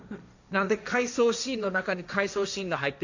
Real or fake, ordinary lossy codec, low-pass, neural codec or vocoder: fake; none; 7.2 kHz; codec, 16 kHz, 1.1 kbps, Voila-Tokenizer